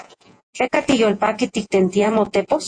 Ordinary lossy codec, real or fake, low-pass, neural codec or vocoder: AAC, 64 kbps; fake; 9.9 kHz; vocoder, 48 kHz, 128 mel bands, Vocos